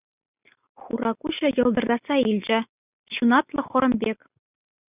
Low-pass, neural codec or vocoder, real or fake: 3.6 kHz; none; real